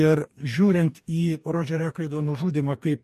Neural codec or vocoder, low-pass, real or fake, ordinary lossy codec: codec, 44.1 kHz, 2.6 kbps, DAC; 14.4 kHz; fake; MP3, 64 kbps